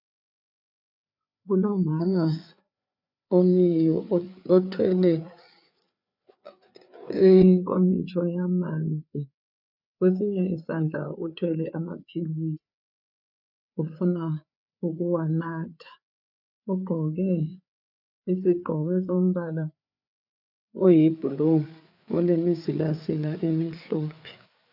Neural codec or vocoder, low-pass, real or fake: codec, 16 kHz, 4 kbps, FreqCodec, larger model; 5.4 kHz; fake